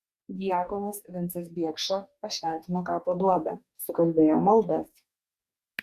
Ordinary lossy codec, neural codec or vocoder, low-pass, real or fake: Opus, 64 kbps; codec, 44.1 kHz, 2.6 kbps, DAC; 14.4 kHz; fake